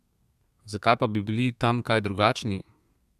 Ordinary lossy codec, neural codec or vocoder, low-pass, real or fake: none; codec, 32 kHz, 1.9 kbps, SNAC; 14.4 kHz; fake